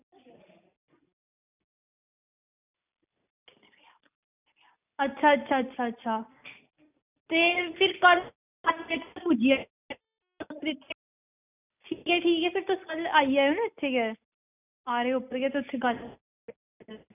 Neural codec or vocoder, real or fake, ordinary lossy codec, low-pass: none; real; none; 3.6 kHz